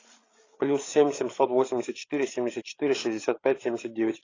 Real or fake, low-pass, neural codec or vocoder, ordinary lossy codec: fake; 7.2 kHz; codec, 16 kHz, 8 kbps, FreqCodec, larger model; AAC, 32 kbps